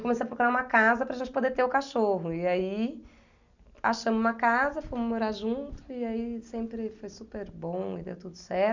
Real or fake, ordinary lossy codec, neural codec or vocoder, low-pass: real; none; none; 7.2 kHz